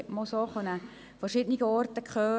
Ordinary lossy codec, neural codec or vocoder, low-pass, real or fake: none; none; none; real